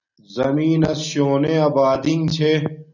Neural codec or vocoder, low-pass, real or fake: none; 7.2 kHz; real